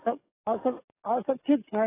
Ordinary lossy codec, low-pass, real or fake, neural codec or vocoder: AAC, 16 kbps; 3.6 kHz; fake; codec, 44.1 kHz, 7.8 kbps, DAC